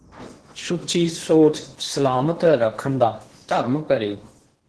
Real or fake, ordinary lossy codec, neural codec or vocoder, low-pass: fake; Opus, 16 kbps; codec, 16 kHz in and 24 kHz out, 0.8 kbps, FocalCodec, streaming, 65536 codes; 10.8 kHz